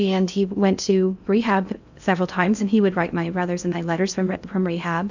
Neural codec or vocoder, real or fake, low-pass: codec, 16 kHz in and 24 kHz out, 0.6 kbps, FocalCodec, streaming, 2048 codes; fake; 7.2 kHz